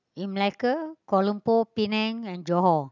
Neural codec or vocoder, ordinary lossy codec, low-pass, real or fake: none; none; 7.2 kHz; real